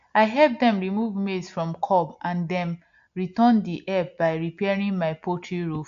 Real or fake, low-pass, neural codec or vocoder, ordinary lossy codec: real; 7.2 kHz; none; MP3, 64 kbps